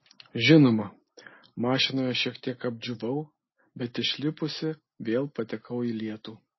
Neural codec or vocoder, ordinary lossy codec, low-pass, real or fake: none; MP3, 24 kbps; 7.2 kHz; real